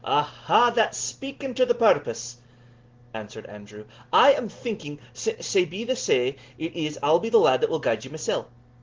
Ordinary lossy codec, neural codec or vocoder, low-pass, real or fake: Opus, 16 kbps; none; 7.2 kHz; real